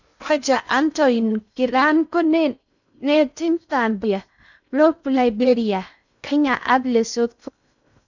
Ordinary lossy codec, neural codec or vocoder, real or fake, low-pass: none; codec, 16 kHz in and 24 kHz out, 0.6 kbps, FocalCodec, streaming, 4096 codes; fake; 7.2 kHz